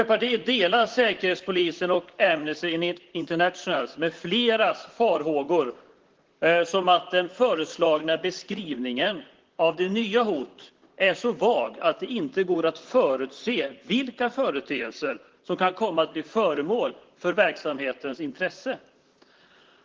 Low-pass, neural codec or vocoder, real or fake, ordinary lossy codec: 7.2 kHz; vocoder, 44.1 kHz, 128 mel bands, Pupu-Vocoder; fake; Opus, 16 kbps